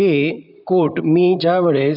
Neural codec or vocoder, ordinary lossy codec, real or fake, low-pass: vocoder, 44.1 kHz, 128 mel bands, Pupu-Vocoder; none; fake; 5.4 kHz